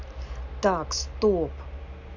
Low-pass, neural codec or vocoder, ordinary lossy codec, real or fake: 7.2 kHz; none; none; real